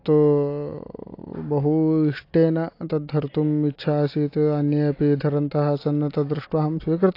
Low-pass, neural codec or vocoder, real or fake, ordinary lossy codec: 5.4 kHz; none; real; AAC, 32 kbps